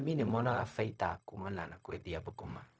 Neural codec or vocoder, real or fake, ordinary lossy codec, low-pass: codec, 16 kHz, 0.4 kbps, LongCat-Audio-Codec; fake; none; none